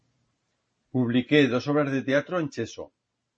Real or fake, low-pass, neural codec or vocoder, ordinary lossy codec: fake; 9.9 kHz; vocoder, 44.1 kHz, 128 mel bands every 512 samples, BigVGAN v2; MP3, 32 kbps